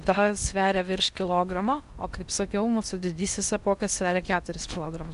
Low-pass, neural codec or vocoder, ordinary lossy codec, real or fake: 10.8 kHz; codec, 16 kHz in and 24 kHz out, 0.8 kbps, FocalCodec, streaming, 65536 codes; MP3, 96 kbps; fake